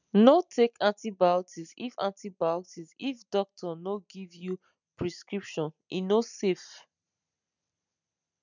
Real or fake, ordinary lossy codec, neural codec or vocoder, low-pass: real; none; none; 7.2 kHz